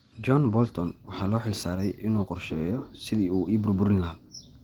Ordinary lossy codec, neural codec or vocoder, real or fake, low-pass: Opus, 24 kbps; none; real; 19.8 kHz